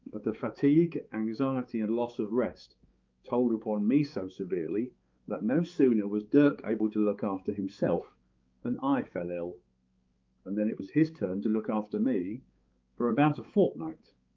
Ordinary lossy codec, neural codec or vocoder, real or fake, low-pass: Opus, 24 kbps; codec, 16 kHz, 4 kbps, X-Codec, HuBERT features, trained on balanced general audio; fake; 7.2 kHz